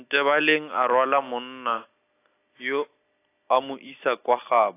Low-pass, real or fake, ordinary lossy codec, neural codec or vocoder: 3.6 kHz; real; AAC, 24 kbps; none